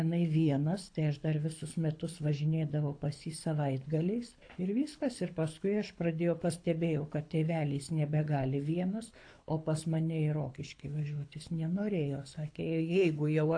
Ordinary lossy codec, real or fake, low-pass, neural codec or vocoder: AAC, 48 kbps; fake; 9.9 kHz; codec, 24 kHz, 6 kbps, HILCodec